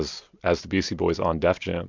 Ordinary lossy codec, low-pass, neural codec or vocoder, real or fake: MP3, 64 kbps; 7.2 kHz; none; real